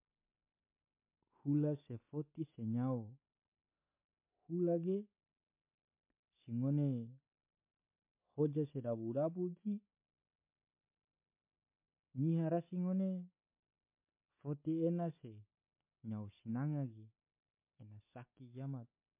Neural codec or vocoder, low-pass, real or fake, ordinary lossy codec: none; 3.6 kHz; real; MP3, 24 kbps